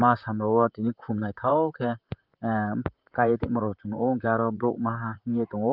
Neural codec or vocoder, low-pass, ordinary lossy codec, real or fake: vocoder, 44.1 kHz, 128 mel bands, Pupu-Vocoder; 5.4 kHz; Opus, 32 kbps; fake